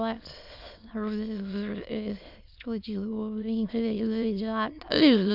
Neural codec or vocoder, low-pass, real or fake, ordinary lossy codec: autoencoder, 22.05 kHz, a latent of 192 numbers a frame, VITS, trained on many speakers; 5.4 kHz; fake; AAC, 48 kbps